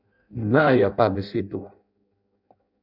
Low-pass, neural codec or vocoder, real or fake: 5.4 kHz; codec, 16 kHz in and 24 kHz out, 0.6 kbps, FireRedTTS-2 codec; fake